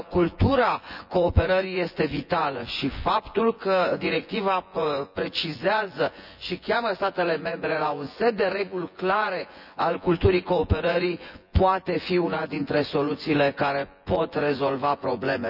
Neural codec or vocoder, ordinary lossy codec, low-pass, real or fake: vocoder, 24 kHz, 100 mel bands, Vocos; none; 5.4 kHz; fake